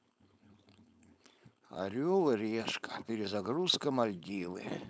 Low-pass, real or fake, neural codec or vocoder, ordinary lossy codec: none; fake; codec, 16 kHz, 4.8 kbps, FACodec; none